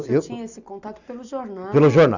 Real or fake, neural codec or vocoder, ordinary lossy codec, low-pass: real; none; none; 7.2 kHz